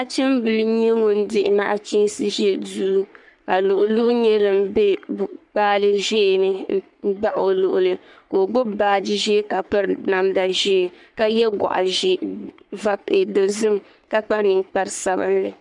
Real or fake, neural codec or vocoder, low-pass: fake; codec, 44.1 kHz, 3.4 kbps, Pupu-Codec; 10.8 kHz